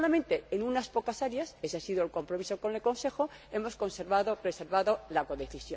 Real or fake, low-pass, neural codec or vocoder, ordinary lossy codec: real; none; none; none